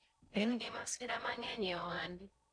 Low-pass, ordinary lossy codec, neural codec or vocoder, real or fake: 9.9 kHz; none; codec, 16 kHz in and 24 kHz out, 0.6 kbps, FocalCodec, streaming, 4096 codes; fake